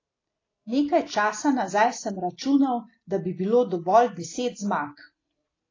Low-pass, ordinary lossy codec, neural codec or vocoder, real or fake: 7.2 kHz; AAC, 32 kbps; none; real